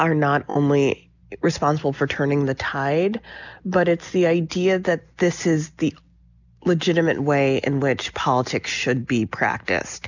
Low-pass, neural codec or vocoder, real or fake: 7.2 kHz; none; real